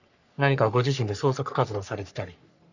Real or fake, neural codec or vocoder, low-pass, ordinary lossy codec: fake; codec, 44.1 kHz, 3.4 kbps, Pupu-Codec; 7.2 kHz; none